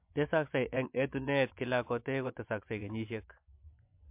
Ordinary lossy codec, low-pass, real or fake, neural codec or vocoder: MP3, 32 kbps; 3.6 kHz; fake; vocoder, 24 kHz, 100 mel bands, Vocos